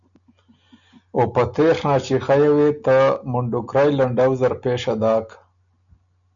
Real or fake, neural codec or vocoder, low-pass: real; none; 7.2 kHz